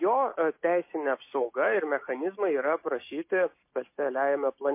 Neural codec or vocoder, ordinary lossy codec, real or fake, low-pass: none; MP3, 24 kbps; real; 3.6 kHz